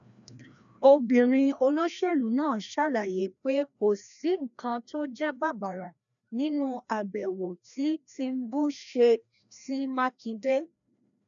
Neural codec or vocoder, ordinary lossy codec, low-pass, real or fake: codec, 16 kHz, 1 kbps, FreqCodec, larger model; none; 7.2 kHz; fake